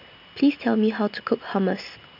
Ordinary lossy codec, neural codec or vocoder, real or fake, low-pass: none; none; real; 5.4 kHz